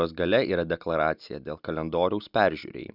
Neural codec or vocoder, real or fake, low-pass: none; real; 5.4 kHz